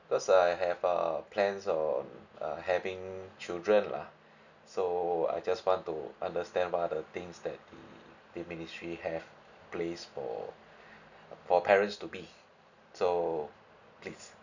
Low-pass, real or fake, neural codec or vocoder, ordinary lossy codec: 7.2 kHz; real; none; none